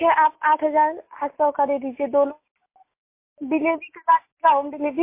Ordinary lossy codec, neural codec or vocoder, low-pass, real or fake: MP3, 24 kbps; none; 3.6 kHz; real